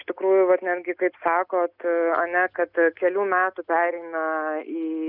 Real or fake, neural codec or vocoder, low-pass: real; none; 5.4 kHz